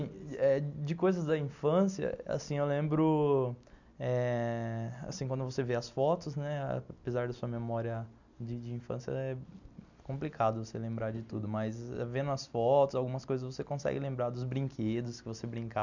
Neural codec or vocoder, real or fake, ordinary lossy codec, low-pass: none; real; none; 7.2 kHz